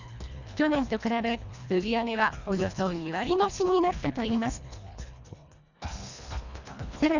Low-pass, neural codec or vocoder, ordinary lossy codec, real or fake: 7.2 kHz; codec, 24 kHz, 1.5 kbps, HILCodec; none; fake